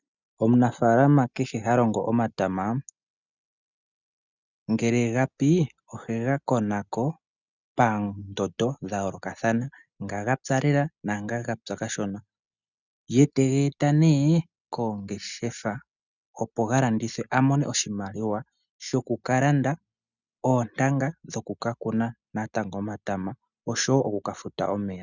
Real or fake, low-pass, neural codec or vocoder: real; 7.2 kHz; none